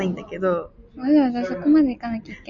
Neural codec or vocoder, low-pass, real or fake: none; 7.2 kHz; real